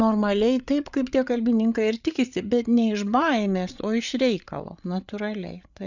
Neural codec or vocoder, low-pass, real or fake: codec, 16 kHz, 8 kbps, FreqCodec, larger model; 7.2 kHz; fake